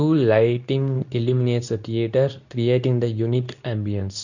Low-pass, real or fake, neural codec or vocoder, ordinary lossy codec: 7.2 kHz; fake; codec, 24 kHz, 0.9 kbps, WavTokenizer, medium speech release version 2; none